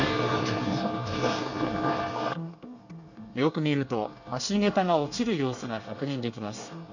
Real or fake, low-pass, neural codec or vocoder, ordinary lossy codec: fake; 7.2 kHz; codec, 24 kHz, 1 kbps, SNAC; none